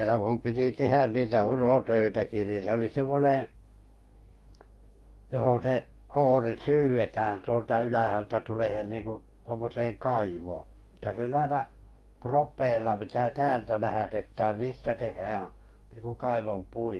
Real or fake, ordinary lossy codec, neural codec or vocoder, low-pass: fake; Opus, 32 kbps; codec, 44.1 kHz, 2.6 kbps, DAC; 19.8 kHz